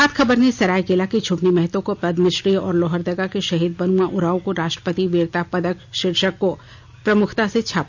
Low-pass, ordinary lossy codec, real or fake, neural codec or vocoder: 7.2 kHz; none; real; none